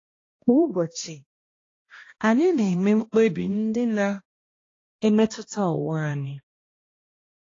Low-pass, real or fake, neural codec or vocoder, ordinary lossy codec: 7.2 kHz; fake; codec, 16 kHz, 1 kbps, X-Codec, HuBERT features, trained on balanced general audio; AAC, 32 kbps